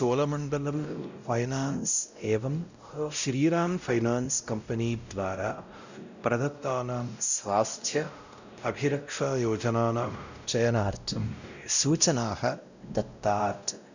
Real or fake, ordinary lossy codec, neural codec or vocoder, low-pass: fake; none; codec, 16 kHz, 0.5 kbps, X-Codec, WavLM features, trained on Multilingual LibriSpeech; 7.2 kHz